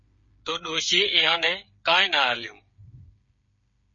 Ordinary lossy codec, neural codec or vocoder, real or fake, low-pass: MP3, 32 kbps; codec, 16 kHz, 8 kbps, FreqCodec, smaller model; fake; 7.2 kHz